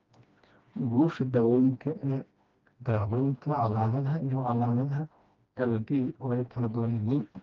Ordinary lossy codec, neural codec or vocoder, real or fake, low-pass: Opus, 32 kbps; codec, 16 kHz, 1 kbps, FreqCodec, smaller model; fake; 7.2 kHz